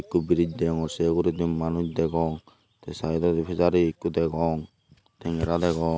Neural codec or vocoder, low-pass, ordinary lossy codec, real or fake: none; none; none; real